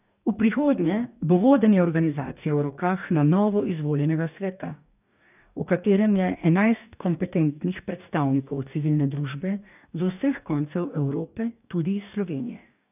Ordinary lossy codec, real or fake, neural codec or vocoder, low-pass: none; fake; codec, 44.1 kHz, 2.6 kbps, DAC; 3.6 kHz